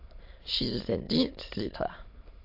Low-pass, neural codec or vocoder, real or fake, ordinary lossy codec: 5.4 kHz; autoencoder, 22.05 kHz, a latent of 192 numbers a frame, VITS, trained on many speakers; fake; MP3, 32 kbps